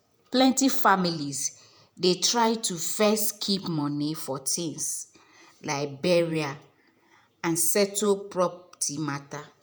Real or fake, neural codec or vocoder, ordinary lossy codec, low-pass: fake; vocoder, 48 kHz, 128 mel bands, Vocos; none; none